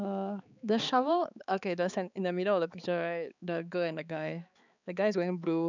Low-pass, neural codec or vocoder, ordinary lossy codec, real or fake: 7.2 kHz; codec, 16 kHz, 4 kbps, X-Codec, HuBERT features, trained on balanced general audio; none; fake